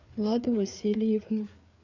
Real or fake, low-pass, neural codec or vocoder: fake; 7.2 kHz; codec, 16 kHz, 4 kbps, FreqCodec, larger model